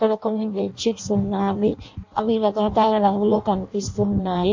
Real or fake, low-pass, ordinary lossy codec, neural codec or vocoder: fake; 7.2 kHz; MP3, 48 kbps; codec, 16 kHz in and 24 kHz out, 0.6 kbps, FireRedTTS-2 codec